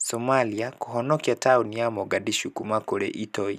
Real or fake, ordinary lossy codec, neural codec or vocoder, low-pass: real; none; none; 14.4 kHz